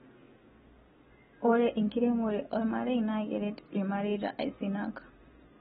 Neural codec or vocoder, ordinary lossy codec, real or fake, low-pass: none; AAC, 16 kbps; real; 19.8 kHz